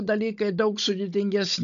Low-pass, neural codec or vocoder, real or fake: 7.2 kHz; codec, 16 kHz, 16 kbps, FunCodec, trained on Chinese and English, 50 frames a second; fake